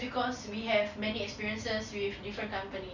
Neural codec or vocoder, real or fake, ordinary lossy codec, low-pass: none; real; none; 7.2 kHz